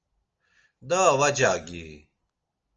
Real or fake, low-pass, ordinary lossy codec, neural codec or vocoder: real; 7.2 kHz; Opus, 24 kbps; none